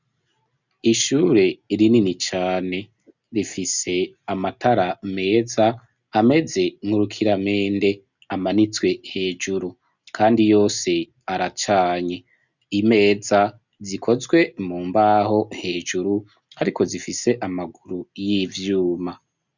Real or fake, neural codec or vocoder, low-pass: real; none; 7.2 kHz